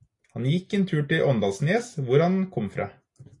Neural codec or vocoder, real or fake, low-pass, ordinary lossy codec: none; real; 10.8 kHz; AAC, 48 kbps